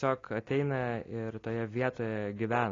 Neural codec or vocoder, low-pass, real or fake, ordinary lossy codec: none; 7.2 kHz; real; AAC, 32 kbps